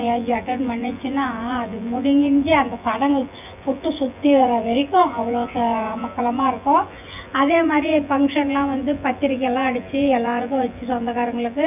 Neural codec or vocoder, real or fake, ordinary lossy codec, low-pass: vocoder, 24 kHz, 100 mel bands, Vocos; fake; none; 3.6 kHz